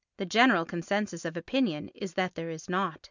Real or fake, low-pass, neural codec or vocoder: real; 7.2 kHz; none